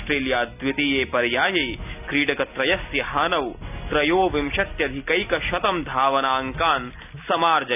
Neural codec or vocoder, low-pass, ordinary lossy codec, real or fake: none; 3.6 kHz; none; real